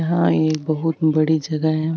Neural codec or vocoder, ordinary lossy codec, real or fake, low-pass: none; none; real; none